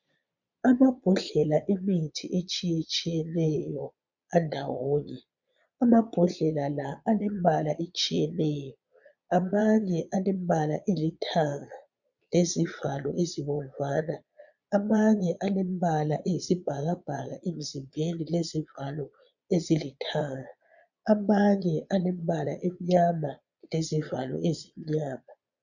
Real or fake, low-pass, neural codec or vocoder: fake; 7.2 kHz; vocoder, 22.05 kHz, 80 mel bands, Vocos